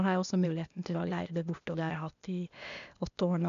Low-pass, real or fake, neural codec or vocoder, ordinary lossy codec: 7.2 kHz; fake; codec, 16 kHz, 0.8 kbps, ZipCodec; none